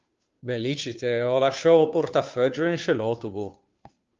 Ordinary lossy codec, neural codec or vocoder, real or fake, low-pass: Opus, 32 kbps; codec, 16 kHz, 2 kbps, FunCodec, trained on Chinese and English, 25 frames a second; fake; 7.2 kHz